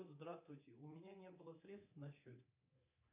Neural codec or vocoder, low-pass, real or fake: codec, 24 kHz, 3.1 kbps, DualCodec; 3.6 kHz; fake